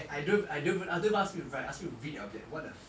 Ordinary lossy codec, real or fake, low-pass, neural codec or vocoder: none; real; none; none